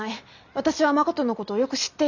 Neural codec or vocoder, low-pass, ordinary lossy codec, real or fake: none; 7.2 kHz; none; real